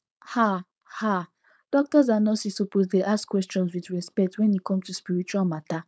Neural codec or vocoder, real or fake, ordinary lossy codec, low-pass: codec, 16 kHz, 4.8 kbps, FACodec; fake; none; none